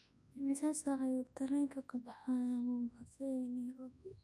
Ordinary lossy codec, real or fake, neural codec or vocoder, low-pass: none; fake; codec, 24 kHz, 0.9 kbps, WavTokenizer, large speech release; none